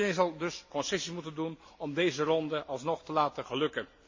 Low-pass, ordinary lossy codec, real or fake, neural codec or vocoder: 7.2 kHz; MP3, 32 kbps; real; none